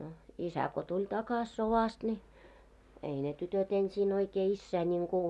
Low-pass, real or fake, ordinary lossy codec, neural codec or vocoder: none; real; none; none